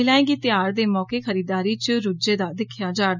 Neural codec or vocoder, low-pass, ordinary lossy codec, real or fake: none; 7.2 kHz; none; real